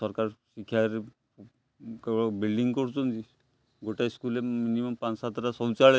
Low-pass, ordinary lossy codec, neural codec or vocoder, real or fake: none; none; none; real